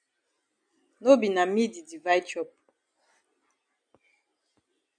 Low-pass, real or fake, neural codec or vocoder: 9.9 kHz; real; none